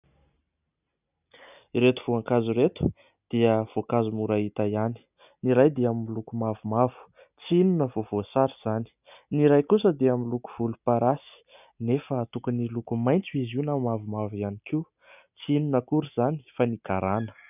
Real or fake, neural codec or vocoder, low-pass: real; none; 3.6 kHz